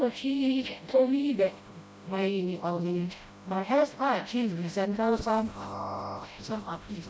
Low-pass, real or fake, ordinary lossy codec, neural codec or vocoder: none; fake; none; codec, 16 kHz, 0.5 kbps, FreqCodec, smaller model